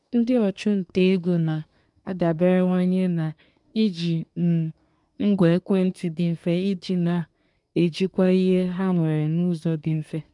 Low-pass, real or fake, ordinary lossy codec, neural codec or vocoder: 10.8 kHz; fake; MP3, 96 kbps; codec, 24 kHz, 1 kbps, SNAC